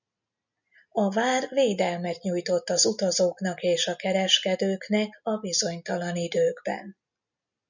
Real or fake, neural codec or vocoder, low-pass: real; none; 7.2 kHz